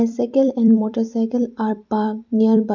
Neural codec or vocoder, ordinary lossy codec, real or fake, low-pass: vocoder, 44.1 kHz, 128 mel bands every 256 samples, BigVGAN v2; none; fake; 7.2 kHz